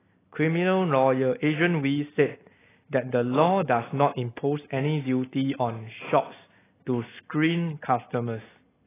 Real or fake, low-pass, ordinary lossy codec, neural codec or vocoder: real; 3.6 kHz; AAC, 16 kbps; none